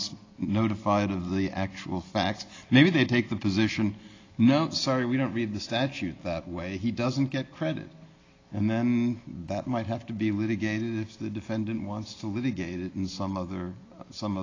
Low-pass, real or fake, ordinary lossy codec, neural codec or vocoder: 7.2 kHz; real; AAC, 32 kbps; none